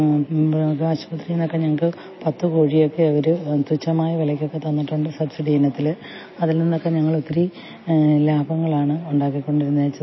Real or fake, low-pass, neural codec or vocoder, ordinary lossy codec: real; 7.2 kHz; none; MP3, 24 kbps